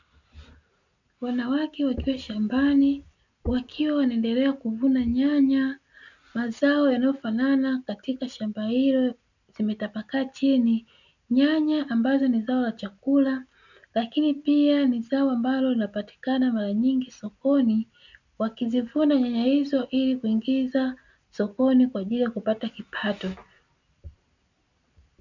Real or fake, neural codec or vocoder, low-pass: real; none; 7.2 kHz